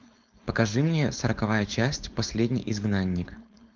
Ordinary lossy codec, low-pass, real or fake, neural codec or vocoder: Opus, 24 kbps; 7.2 kHz; fake; codec, 16 kHz, 4.8 kbps, FACodec